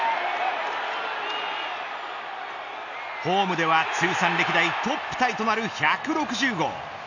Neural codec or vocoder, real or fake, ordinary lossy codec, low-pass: none; real; none; 7.2 kHz